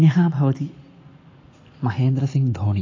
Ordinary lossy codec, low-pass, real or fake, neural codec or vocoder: AAC, 32 kbps; 7.2 kHz; real; none